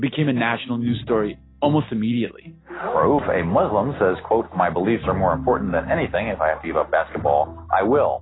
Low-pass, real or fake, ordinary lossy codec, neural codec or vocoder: 7.2 kHz; real; AAC, 16 kbps; none